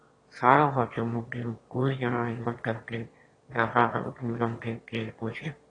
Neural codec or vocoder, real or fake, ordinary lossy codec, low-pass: autoencoder, 22.05 kHz, a latent of 192 numbers a frame, VITS, trained on one speaker; fake; AAC, 32 kbps; 9.9 kHz